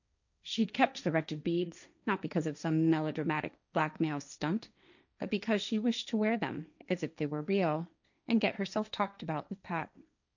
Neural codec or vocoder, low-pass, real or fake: codec, 16 kHz, 1.1 kbps, Voila-Tokenizer; 7.2 kHz; fake